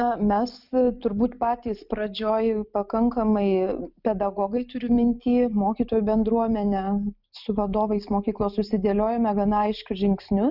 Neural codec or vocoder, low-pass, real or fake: none; 5.4 kHz; real